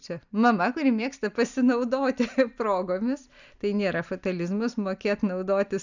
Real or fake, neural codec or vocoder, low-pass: real; none; 7.2 kHz